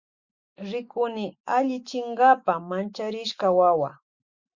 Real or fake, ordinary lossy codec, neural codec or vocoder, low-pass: fake; Opus, 64 kbps; autoencoder, 48 kHz, 128 numbers a frame, DAC-VAE, trained on Japanese speech; 7.2 kHz